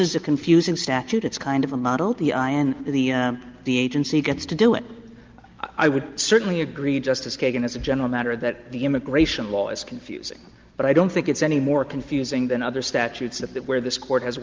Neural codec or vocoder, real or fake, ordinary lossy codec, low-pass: none; real; Opus, 24 kbps; 7.2 kHz